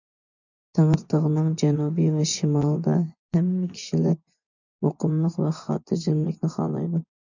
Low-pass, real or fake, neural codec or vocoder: 7.2 kHz; fake; vocoder, 24 kHz, 100 mel bands, Vocos